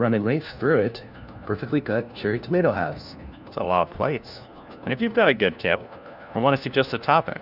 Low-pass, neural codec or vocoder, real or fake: 5.4 kHz; codec, 16 kHz, 1 kbps, FunCodec, trained on LibriTTS, 50 frames a second; fake